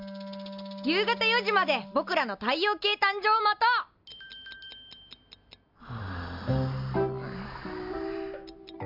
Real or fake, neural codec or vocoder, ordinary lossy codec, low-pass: real; none; MP3, 48 kbps; 5.4 kHz